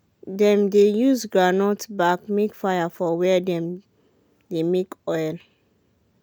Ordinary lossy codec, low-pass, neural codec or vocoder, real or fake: none; none; none; real